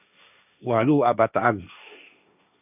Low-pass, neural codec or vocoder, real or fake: 3.6 kHz; codec, 16 kHz, 1.1 kbps, Voila-Tokenizer; fake